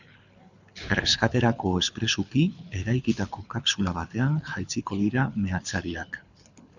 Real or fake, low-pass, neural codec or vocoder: fake; 7.2 kHz; codec, 24 kHz, 6 kbps, HILCodec